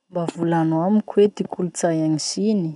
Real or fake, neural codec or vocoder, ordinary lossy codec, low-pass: real; none; none; 10.8 kHz